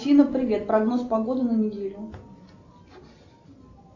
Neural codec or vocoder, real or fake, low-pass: none; real; 7.2 kHz